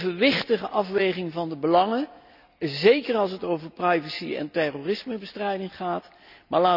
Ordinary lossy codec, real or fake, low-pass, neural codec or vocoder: none; real; 5.4 kHz; none